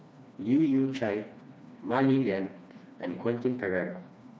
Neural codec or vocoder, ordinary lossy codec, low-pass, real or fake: codec, 16 kHz, 2 kbps, FreqCodec, smaller model; none; none; fake